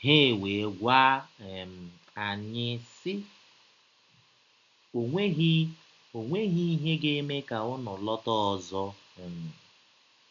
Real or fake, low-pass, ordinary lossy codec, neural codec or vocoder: real; 7.2 kHz; none; none